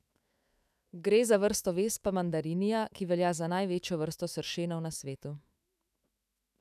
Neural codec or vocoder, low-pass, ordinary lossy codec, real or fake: autoencoder, 48 kHz, 128 numbers a frame, DAC-VAE, trained on Japanese speech; 14.4 kHz; none; fake